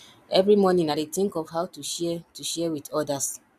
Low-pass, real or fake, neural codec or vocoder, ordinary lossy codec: 14.4 kHz; real; none; none